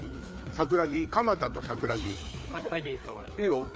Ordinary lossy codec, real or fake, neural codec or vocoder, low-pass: none; fake; codec, 16 kHz, 4 kbps, FreqCodec, larger model; none